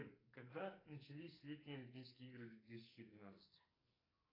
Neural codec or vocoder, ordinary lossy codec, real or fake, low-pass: codec, 44.1 kHz, 2.6 kbps, SNAC; AAC, 24 kbps; fake; 5.4 kHz